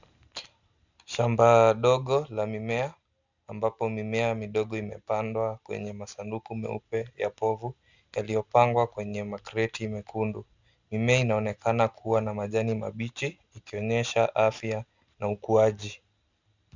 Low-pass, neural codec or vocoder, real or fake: 7.2 kHz; none; real